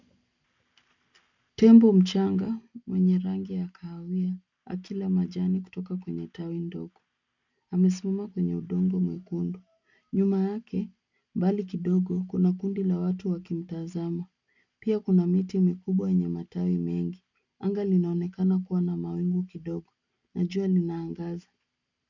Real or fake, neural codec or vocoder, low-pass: real; none; 7.2 kHz